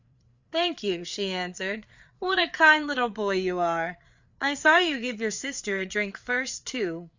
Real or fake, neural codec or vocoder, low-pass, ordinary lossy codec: fake; codec, 16 kHz, 4 kbps, FreqCodec, larger model; 7.2 kHz; Opus, 64 kbps